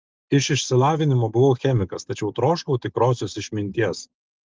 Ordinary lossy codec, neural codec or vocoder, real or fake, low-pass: Opus, 24 kbps; none; real; 7.2 kHz